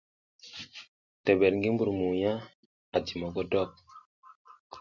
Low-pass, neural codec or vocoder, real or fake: 7.2 kHz; none; real